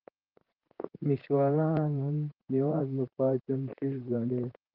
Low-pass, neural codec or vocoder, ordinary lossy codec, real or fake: 5.4 kHz; vocoder, 44.1 kHz, 128 mel bands, Pupu-Vocoder; Opus, 16 kbps; fake